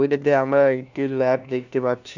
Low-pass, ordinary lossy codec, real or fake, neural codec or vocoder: 7.2 kHz; none; fake; codec, 16 kHz, 1 kbps, FunCodec, trained on LibriTTS, 50 frames a second